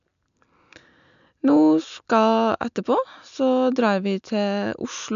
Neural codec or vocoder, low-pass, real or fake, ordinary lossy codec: none; 7.2 kHz; real; none